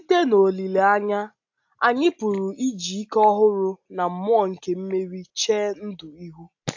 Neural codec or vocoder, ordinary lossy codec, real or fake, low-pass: none; AAC, 48 kbps; real; 7.2 kHz